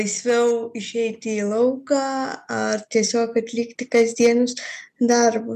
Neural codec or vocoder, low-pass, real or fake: none; 14.4 kHz; real